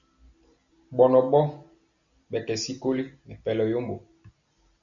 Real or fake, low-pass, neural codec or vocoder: real; 7.2 kHz; none